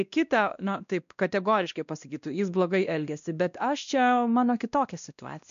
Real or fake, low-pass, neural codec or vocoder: fake; 7.2 kHz; codec, 16 kHz, 1 kbps, X-Codec, WavLM features, trained on Multilingual LibriSpeech